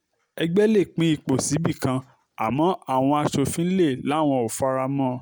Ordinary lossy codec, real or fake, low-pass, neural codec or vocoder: none; real; none; none